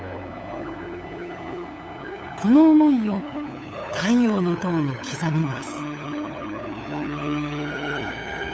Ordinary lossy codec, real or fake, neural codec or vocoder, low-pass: none; fake; codec, 16 kHz, 8 kbps, FunCodec, trained on LibriTTS, 25 frames a second; none